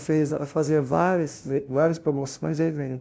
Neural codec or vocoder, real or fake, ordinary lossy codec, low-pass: codec, 16 kHz, 0.5 kbps, FunCodec, trained on LibriTTS, 25 frames a second; fake; none; none